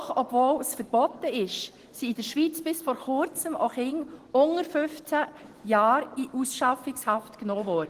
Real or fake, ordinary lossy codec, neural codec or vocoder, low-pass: real; Opus, 16 kbps; none; 14.4 kHz